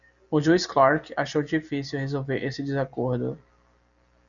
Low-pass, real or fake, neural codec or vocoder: 7.2 kHz; real; none